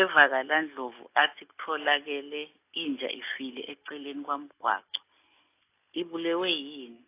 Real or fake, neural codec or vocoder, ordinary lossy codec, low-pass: real; none; AAC, 24 kbps; 3.6 kHz